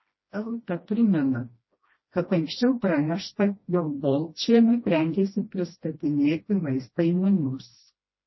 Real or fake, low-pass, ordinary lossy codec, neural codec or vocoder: fake; 7.2 kHz; MP3, 24 kbps; codec, 16 kHz, 1 kbps, FreqCodec, smaller model